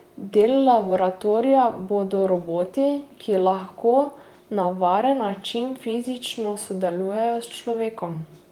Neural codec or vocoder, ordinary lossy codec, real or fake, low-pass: vocoder, 44.1 kHz, 128 mel bands, Pupu-Vocoder; Opus, 32 kbps; fake; 19.8 kHz